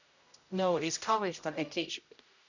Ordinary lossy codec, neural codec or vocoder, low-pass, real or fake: none; codec, 16 kHz, 0.5 kbps, X-Codec, HuBERT features, trained on general audio; 7.2 kHz; fake